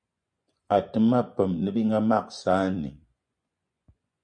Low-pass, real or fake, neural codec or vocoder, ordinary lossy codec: 9.9 kHz; fake; vocoder, 24 kHz, 100 mel bands, Vocos; MP3, 48 kbps